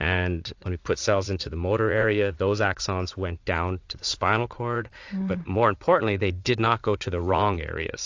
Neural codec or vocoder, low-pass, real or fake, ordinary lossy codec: vocoder, 44.1 kHz, 80 mel bands, Vocos; 7.2 kHz; fake; AAC, 48 kbps